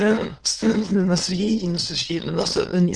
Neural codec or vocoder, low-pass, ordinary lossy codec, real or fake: autoencoder, 22.05 kHz, a latent of 192 numbers a frame, VITS, trained on many speakers; 9.9 kHz; Opus, 16 kbps; fake